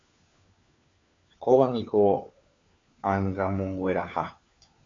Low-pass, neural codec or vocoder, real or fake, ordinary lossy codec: 7.2 kHz; codec, 16 kHz, 4 kbps, FunCodec, trained on LibriTTS, 50 frames a second; fake; AAC, 48 kbps